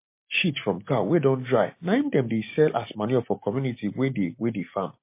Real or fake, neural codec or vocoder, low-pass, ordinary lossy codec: real; none; 3.6 kHz; MP3, 24 kbps